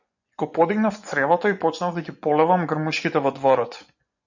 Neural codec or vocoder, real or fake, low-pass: vocoder, 24 kHz, 100 mel bands, Vocos; fake; 7.2 kHz